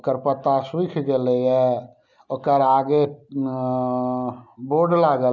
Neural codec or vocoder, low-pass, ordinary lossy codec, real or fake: none; 7.2 kHz; none; real